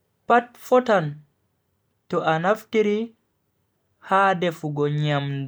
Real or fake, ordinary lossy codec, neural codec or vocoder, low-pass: real; none; none; none